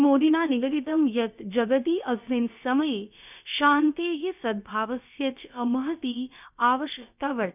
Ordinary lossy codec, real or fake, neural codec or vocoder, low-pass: none; fake; codec, 16 kHz, about 1 kbps, DyCAST, with the encoder's durations; 3.6 kHz